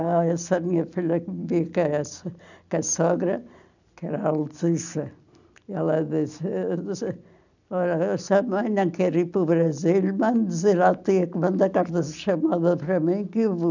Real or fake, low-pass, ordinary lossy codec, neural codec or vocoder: real; 7.2 kHz; none; none